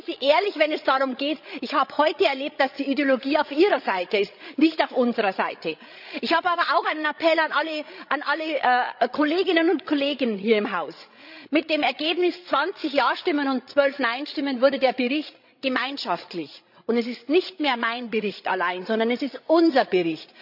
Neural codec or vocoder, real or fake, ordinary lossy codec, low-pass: codec, 16 kHz, 16 kbps, FreqCodec, larger model; fake; none; 5.4 kHz